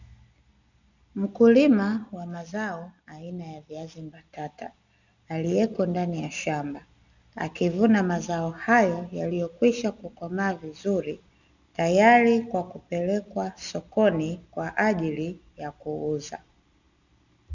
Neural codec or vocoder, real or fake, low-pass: none; real; 7.2 kHz